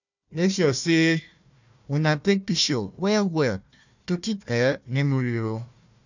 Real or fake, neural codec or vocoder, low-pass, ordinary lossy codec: fake; codec, 16 kHz, 1 kbps, FunCodec, trained on Chinese and English, 50 frames a second; 7.2 kHz; none